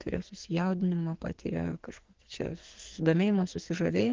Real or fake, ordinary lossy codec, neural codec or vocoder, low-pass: fake; Opus, 16 kbps; codec, 44.1 kHz, 2.6 kbps, SNAC; 7.2 kHz